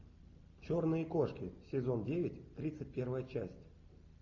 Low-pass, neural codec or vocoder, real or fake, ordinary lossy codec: 7.2 kHz; none; real; AAC, 48 kbps